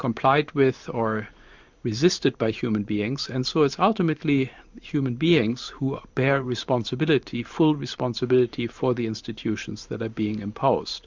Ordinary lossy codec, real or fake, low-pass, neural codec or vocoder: MP3, 64 kbps; fake; 7.2 kHz; vocoder, 44.1 kHz, 128 mel bands every 512 samples, BigVGAN v2